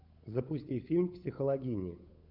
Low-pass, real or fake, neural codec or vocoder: 5.4 kHz; fake; codec, 16 kHz, 8 kbps, FunCodec, trained on Chinese and English, 25 frames a second